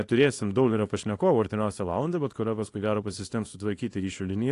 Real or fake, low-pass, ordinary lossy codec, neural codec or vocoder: fake; 10.8 kHz; AAC, 48 kbps; codec, 24 kHz, 0.9 kbps, WavTokenizer, small release